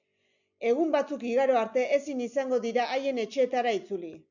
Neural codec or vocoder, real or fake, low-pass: none; real; 7.2 kHz